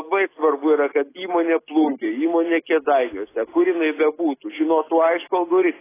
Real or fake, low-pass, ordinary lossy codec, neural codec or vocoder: real; 3.6 kHz; AAC, 16 kbps; none